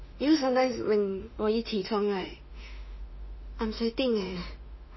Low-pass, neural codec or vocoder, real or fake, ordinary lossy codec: 7.2 kHz; autoencoder, 48 kHz, 32 numbers a frame, DAC-VAE, trained on Japanese speech; fake; MP3, 24 kbps